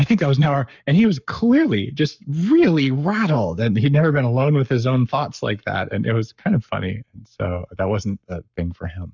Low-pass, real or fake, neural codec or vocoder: 7.2 kHz; fake; codec, 44.1 kHz, 7.8 kbps, Pupu-Codec